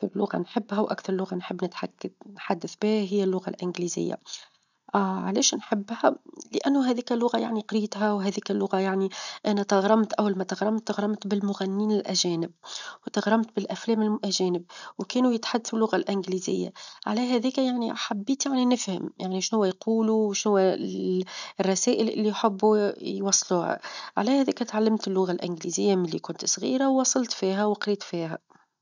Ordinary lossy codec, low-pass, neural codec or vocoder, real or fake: none; 7.2 kHz; none; real